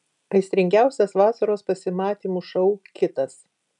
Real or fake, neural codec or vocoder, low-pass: real; none; 10.8 kHz